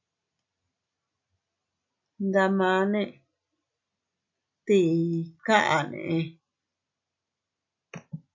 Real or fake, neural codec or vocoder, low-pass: real; none; 7.2 kHz